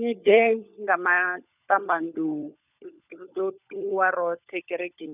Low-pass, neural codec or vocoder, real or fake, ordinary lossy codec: 3.6 kHz; codec, 16 kHz, 16 kbps, FunCodec, trained on LibriTTS, 50 frames a second; fake; none